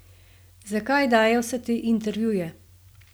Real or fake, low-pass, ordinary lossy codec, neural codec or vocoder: real; none; none; none